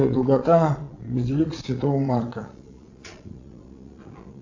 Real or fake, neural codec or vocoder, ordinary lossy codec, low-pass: fake; vocoder, 22.05 kHz, 80 mel bands, Vocos; AAC, 48 kbps; 7.2 kHz